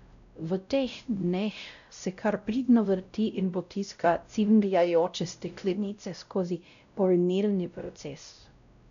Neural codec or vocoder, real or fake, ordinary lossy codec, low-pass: codec, 16 kHz, 0.5 kbps, X-Codec, WavLM features, trained on Multilingual LibriSpeech; fake; none; 7.2 kHz